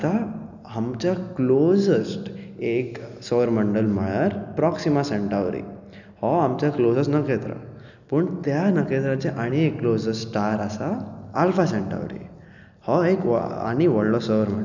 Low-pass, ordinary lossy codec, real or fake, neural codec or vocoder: 7.2 kHz; none; real; none